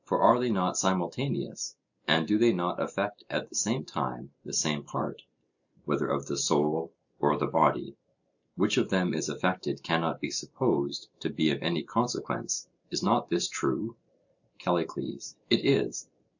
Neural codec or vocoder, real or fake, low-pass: none; real; 7.2 kHz